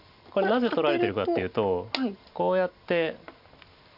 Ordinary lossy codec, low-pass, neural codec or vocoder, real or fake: none; 5.4 kHz; none; real